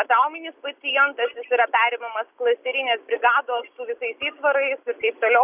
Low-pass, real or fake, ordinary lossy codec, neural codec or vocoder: 3.6 kHz; real; Opus, 64 kbps; none